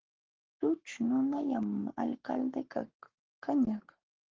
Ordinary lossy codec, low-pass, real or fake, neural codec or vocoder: Opus, 16 kbps; 7.2 kHz; fake; codec, 44.1 kHz, 7.8 kbps, Pupu-Codec